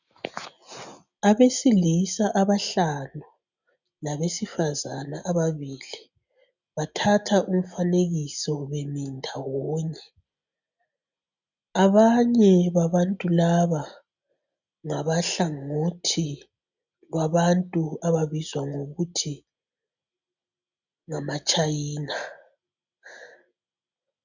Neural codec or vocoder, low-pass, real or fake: none; 7.2 kHz; real